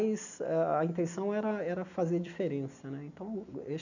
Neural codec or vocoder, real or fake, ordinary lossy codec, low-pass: none; real; none; 7.2 kHz